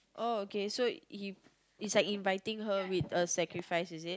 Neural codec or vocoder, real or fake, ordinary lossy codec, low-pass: none; real; none; none